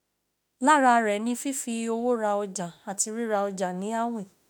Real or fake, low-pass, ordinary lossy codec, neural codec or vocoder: fake; none; none; autoencoder, 48 kHz, 32 numbers a frame, DAC-VAE, trained on Japanese speech